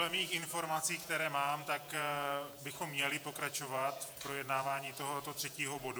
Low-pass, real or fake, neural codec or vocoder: 19.8 kHz; fake; vocoder, 48 kHz, 128 mel bands, Vocos